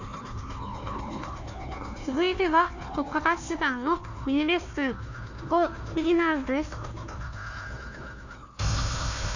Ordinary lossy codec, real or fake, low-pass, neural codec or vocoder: none; fake; 7.2 kHz; codec, 16 kHz, 1 kbps, FunCodec, trained on Chinese and English, 50 frames a second